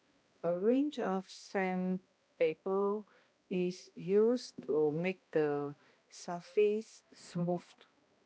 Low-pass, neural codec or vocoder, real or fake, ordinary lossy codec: none; codec, 16 kHz, 1 kbps, X-Codec, HuBERT features, trained on balanced general audio; fake; none